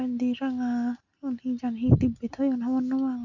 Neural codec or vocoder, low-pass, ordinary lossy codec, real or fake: none; 7.2 kHz; Opus, 64 kbps; real